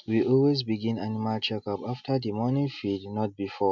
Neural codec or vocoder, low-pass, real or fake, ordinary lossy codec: none; 7.2 kHz; real; none